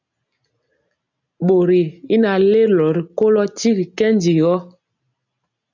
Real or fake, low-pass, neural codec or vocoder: real; 7.2 kHz; none